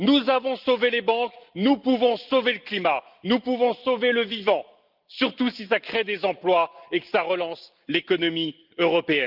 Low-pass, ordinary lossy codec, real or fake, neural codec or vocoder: 5.4 kHz; Opus, 24 kbps; real; none